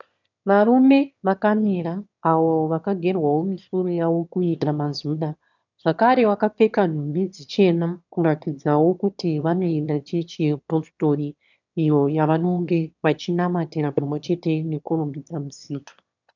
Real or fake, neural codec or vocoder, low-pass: fake; autoencoder, 22.05 kHz, a latent of 192 numbers a frame, VITS, trained on one speaker; 7.2 kHz